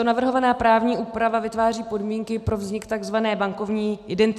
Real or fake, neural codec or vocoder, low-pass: real; none; 14.4 kHz